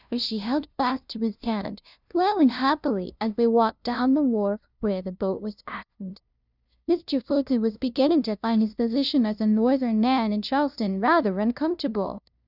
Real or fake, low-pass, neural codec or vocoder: fake; 5.4 kHz; codec, 16 kHz, 0.5 kbps, FunCodec, trained on LibriTTS, 25 frames a second